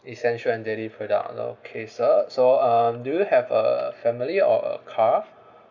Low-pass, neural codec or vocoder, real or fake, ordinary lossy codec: 7.2 kHz; none; real; none